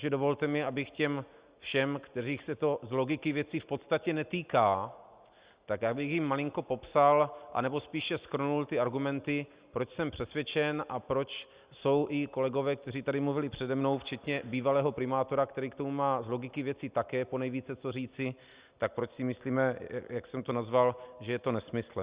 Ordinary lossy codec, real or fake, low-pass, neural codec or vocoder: Opus, 64 kbps; real; 3.6 kHz; none